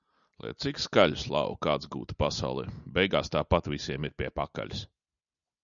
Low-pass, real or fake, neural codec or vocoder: 7.2 kHz; real; none